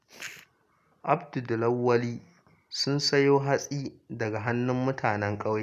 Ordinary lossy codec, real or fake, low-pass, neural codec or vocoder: none; real; 14.4 kHz; none